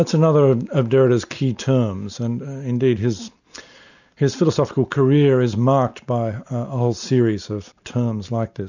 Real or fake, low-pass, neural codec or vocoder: real; 7.2 kHz; none